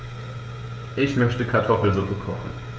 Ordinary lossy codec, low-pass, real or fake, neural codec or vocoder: none; none; fake; codec, 16 kHz, 16 kbps, FreqCodec, smaller model